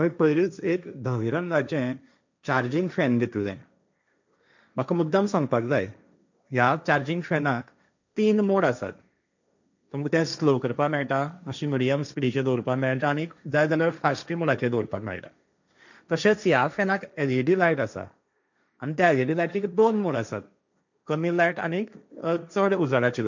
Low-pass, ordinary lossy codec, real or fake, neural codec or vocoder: 7.2 kHz; none; fake; codec, 16 kHz, 1.1 kbps, Voila-Tokenizer